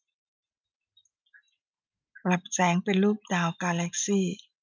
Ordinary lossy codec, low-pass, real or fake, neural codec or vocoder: none; none; real; none